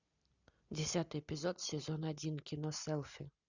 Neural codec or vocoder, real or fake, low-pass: none; real; 7.2 kHz